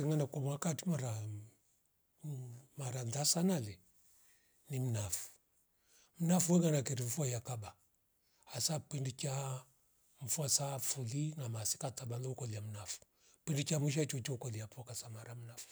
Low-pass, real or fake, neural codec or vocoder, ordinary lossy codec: none; real; none; none